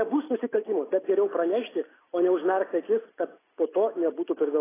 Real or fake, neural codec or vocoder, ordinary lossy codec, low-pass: real; none; AAC, 16 kbps; 3.6 kHz